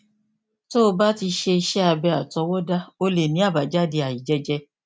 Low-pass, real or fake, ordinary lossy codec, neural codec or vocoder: none; real; none; none